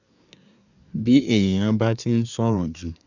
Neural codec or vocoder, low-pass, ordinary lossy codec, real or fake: codec, 24 kHz, 1 kbps, SNAC; 7.2 kHz; none; fake